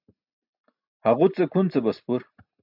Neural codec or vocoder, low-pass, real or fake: none; 5.4 kHz; real